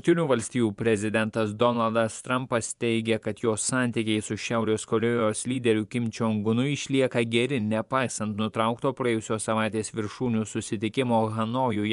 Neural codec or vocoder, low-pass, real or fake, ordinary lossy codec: vocoder, 24 kHz, 100 mel bands, Vocos; 10.8 kHz; fake; MP3, 96 kbps